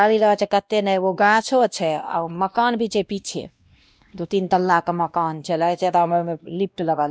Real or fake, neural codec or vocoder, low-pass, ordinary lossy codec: fake; codec, 16 kHz, 1 kbps, X-Codec, WavLM features, trained on Multilingual LibriSpeech; none; none